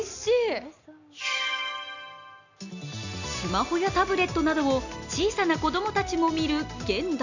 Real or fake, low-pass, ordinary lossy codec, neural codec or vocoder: real; 7.2 kHz; none; none